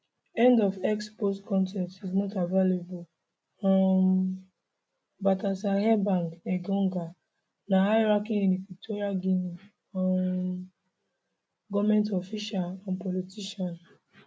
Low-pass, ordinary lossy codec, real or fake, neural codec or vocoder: none; none; real; none